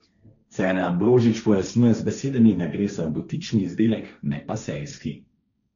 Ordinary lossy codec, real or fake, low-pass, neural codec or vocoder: none; fake; 7.2 kHz; codec, 16 kHz, 1.1 kbps, Voila-Tokenizer